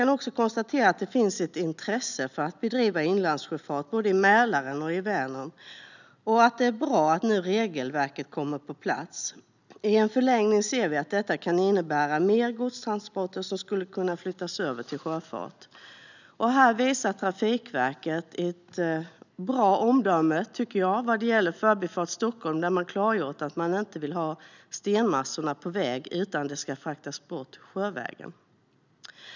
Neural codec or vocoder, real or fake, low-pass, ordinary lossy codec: none; real; 7.2 kHz; none